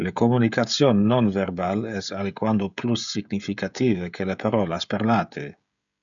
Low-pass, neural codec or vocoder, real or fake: 7.2 kHz; codec, 16 kHz, 16 kbps, FreqCodec, smaller model; fake